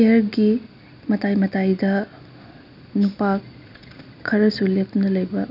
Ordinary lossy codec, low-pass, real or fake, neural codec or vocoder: none; 5.4 kHz; real; none